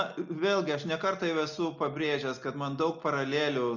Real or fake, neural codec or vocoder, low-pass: real; none; 7.2 kHz